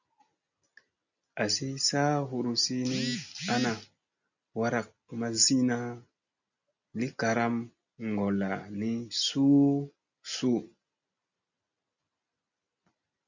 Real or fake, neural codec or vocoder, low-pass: real; none; 7.2 kHz